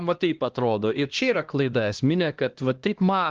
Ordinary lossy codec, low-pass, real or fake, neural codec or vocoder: Opus, 16 kbps; 7.2 kHz; fake; codec, 16 kHz, 1 kbps, X-Codec, HuBERT features, trained on LibriSpeech